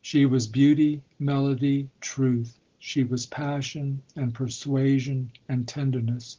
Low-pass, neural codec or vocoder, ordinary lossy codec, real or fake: 7.2 kHz; none; Opus, 16 kbps; real